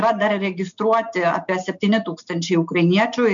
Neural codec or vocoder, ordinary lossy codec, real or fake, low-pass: none; MP3, 64 kbps; real; 7.2 kHz